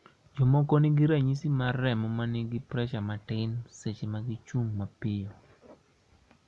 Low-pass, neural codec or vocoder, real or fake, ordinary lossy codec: none; none; real; none